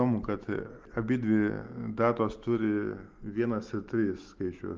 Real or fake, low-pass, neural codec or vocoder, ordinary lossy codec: real; 7.2 kHz; none; Opus, 24 kbps